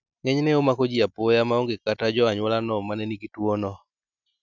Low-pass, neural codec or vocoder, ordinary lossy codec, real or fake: 7.2 kHz; none; none; real